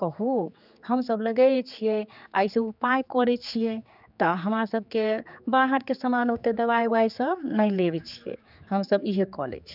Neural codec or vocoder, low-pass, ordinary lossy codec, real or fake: codec, 16 kHz, 4 kbps, X-Codec, HuBERT features, trained on general audio; 5.4 kHz; none; fake